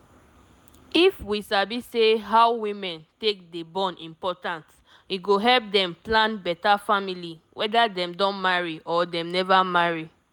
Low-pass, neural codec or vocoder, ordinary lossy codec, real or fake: none; none; none; real